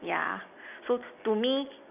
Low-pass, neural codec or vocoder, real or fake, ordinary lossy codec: 3.6 kHz; none; real; none